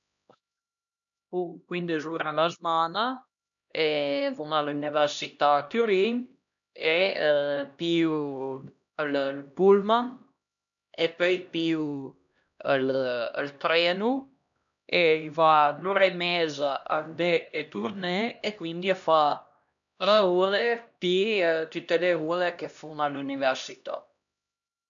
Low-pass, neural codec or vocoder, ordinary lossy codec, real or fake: 7.2 kHz; codec, 16 kHz, 1 kbps, X-Codec, HuBERT features, trained on LibriSpeech; none; fake